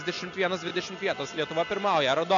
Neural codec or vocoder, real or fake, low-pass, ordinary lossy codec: none; real; 7.2 kHz; MP3, 96 kbps